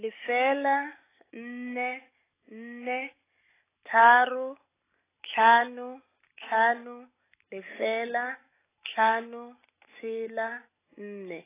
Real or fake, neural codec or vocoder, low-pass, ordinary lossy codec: real; none; 3.6 kHz; AAC, 16 kbps